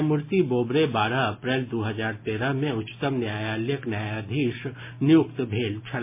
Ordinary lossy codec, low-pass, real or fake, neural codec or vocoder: MP3, 24 kbps; 3.6 kHz; real; none